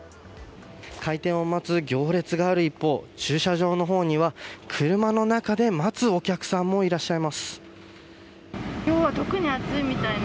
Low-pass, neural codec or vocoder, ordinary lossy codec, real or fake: none; none; none; real